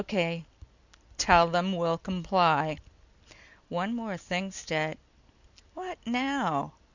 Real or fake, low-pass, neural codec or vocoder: real; 7.2 kHz; none